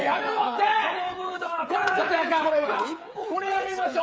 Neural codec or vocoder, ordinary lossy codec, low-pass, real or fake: codec, 16 kHz, 8 kbps, FreqCodec, smaller model; none; none; fake